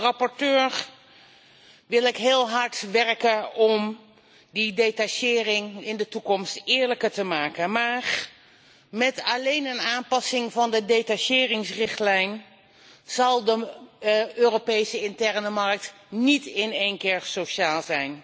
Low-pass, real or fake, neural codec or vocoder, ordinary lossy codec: none; real; none; none